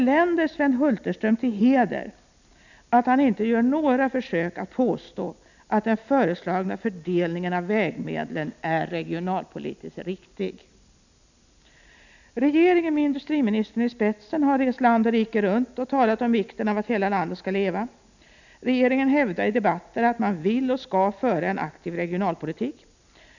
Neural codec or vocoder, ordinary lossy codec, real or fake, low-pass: none; none; real; 7.2 kHz